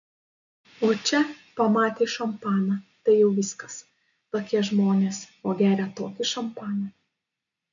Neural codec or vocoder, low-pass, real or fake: none; 7.2 kHz; real